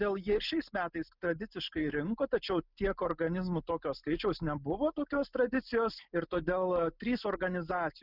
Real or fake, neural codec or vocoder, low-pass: real; none; 5.4 kHz